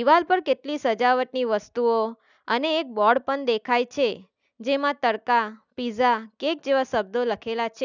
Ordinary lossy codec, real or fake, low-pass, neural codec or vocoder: none; real; 7.2 kHz; none